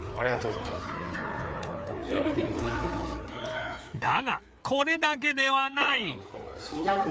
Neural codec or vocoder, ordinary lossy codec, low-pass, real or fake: codec, 16 kHz, 4 kbps, FreqCodec, larger model; none; none; fake